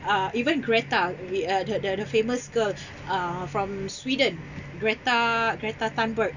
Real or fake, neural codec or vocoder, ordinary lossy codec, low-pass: real; none; none; 7.2 kHz